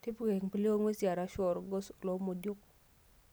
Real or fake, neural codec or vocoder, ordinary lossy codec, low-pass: real; none; none; none